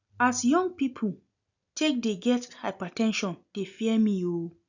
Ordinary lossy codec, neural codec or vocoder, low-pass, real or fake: none; none; 7.2 kHz; real